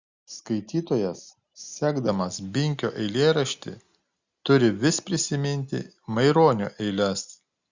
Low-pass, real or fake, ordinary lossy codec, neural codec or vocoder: 7.2 kHz; real; Opus, 64 kbps; none